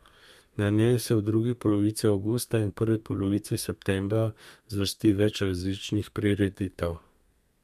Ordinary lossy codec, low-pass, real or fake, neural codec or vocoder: MP3, 96 kbps; 14.4 kHz; fake; codec, 32 kHz, 1.9 kbps, SNAC